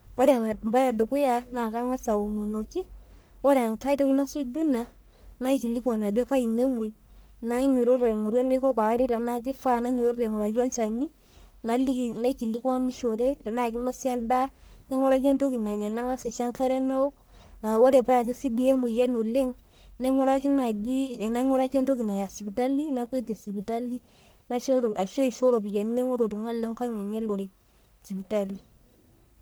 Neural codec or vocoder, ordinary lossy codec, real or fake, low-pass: codec, 44.1 kHz, 1.7 kbps, Pupu-Codec; none; fake; none